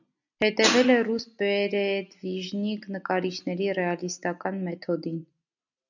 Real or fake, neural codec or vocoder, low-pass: real; none; 7.2 kHz